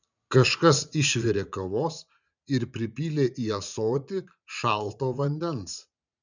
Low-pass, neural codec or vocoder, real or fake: 7.2 kHz; vocoder, 44.1 kHz, 80 mel bands, Vocos; fake